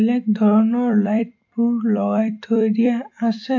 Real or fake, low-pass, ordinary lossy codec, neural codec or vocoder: fake; 7.2 kHz; AAC, 48 kbps; vocoder, 44.1 kHz, 128 mel bands every 256 samples, BigVGAN v2